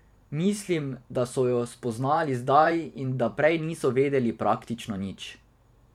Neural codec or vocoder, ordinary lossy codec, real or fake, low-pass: vocoder, 44.1 kHz, 128 mel bands every 512 samples, BigVGAN v2; MP3, 96 kbps; fake; 19.8 kHz